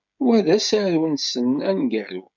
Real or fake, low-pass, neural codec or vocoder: fake; 7.2 kHz; codec, 16 kHz, 16 kbps, FreqCodec, smaller model